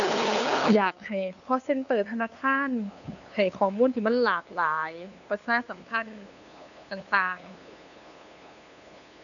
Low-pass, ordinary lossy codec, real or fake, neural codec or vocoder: 7.2 kHz; none; fake; codec, 16 kHz, 2 kbps, FunCodec, trained on LibriTTS, 25 frames a second